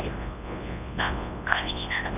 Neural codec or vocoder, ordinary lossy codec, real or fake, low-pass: codec, 24 kHz, 0.9 kbps, WavTokenizer, large speech release; none; fake; 3.6 kHz